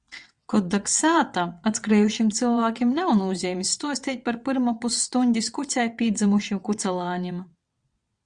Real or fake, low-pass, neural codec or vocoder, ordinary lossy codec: fake; 9.9 kHz; vocoder, 22.05 kHz, 80 mel bands, WaveNeXt; Opus, 64 kbps